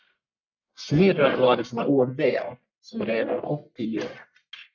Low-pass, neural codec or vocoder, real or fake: 7.2 kHz; codec, 44.1 kHz, 1.7 kbps, Pupu-Codec; fake